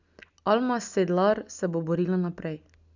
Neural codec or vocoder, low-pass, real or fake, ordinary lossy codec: none; 7.2 kHz; real; none